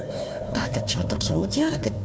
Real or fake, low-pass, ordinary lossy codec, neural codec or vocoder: fake; none; none; codec, 16 kHz, 1 kbps, FunCodec, trained on Chinese and English, 50 frames a second